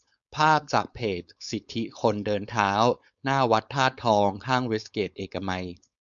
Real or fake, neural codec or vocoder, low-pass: fake; codec, 16 kHz, 4.8 kbps, FACodec; 7.2 kHz